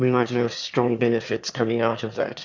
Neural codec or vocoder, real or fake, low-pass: autoencoder, 22.05 kHz, a latent of 192 numbers a frame, VITS, trained on one speaker; fake; 7.2 kHz